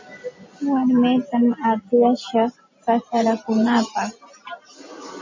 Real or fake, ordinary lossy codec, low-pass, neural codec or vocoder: real; MP3, 32 kbps; 7.2 kHz; none